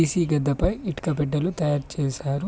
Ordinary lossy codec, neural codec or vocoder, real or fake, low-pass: none; none; real; none